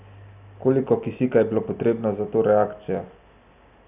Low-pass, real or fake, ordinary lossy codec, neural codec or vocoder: 3.6 kHz; real; none; none